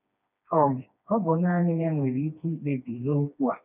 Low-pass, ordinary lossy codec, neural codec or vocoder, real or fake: 3.6 kHz; none; codec, 16 kHz, 2 kbps, FreqCodec, smaller model; fake